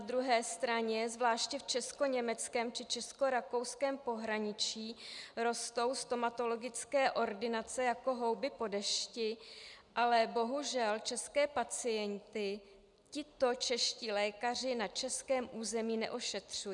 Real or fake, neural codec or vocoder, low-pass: real; none; 10.8 kHz